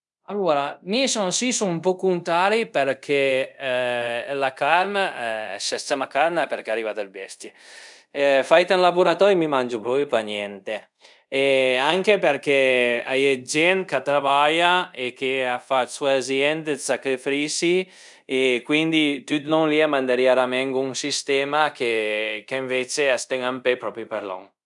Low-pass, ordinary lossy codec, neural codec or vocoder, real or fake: 10.8 kHz; none; codec, 24 kHz, 0.5 kbps, DualCodec; fake